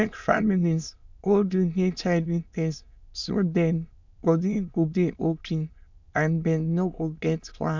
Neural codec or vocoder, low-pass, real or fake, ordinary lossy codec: autoencoder, 22.05 kHz, a latent of 192 numbers a frame, VITS, trained on many speakers; 7.2 kHz; fake; MP3, 64 kbps